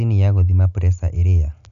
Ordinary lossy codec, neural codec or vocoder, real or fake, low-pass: AAC, 96 kbps; none; real; 7.2 kHz